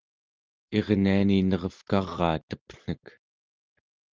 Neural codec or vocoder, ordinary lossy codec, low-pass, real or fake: none; Opus, 32 kbps; 7.2 kHz; real